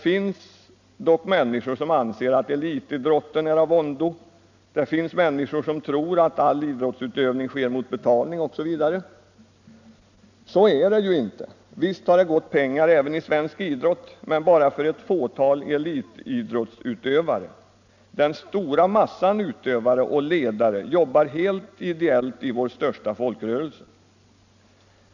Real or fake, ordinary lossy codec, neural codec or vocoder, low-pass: real; none; none; 7.2 kHz